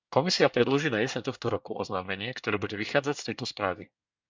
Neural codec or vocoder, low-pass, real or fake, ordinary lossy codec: codec, 24 kHz, 1 kbps, SNAC; 7.2 kHz; fake; MP3, 64 kbps